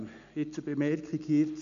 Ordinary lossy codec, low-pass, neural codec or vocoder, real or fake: none; 7.2 kHz; none; real